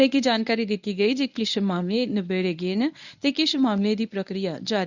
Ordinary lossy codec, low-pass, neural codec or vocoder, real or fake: none; 7.2 kHz; codec, 24 kHz, 0.9 kbps, WavTokenizer, medium speech release version 1; fake